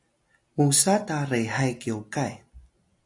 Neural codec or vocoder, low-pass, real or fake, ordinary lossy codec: none; 10.8 kHz; real; AAC, 64 kbps